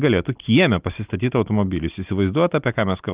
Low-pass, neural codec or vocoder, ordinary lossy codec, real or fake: 3.6 kHz; none; Opus, 32 kbps; real